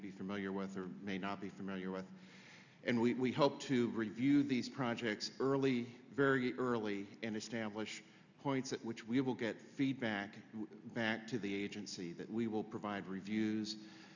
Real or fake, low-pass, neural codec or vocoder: real; 7.2 kHz; none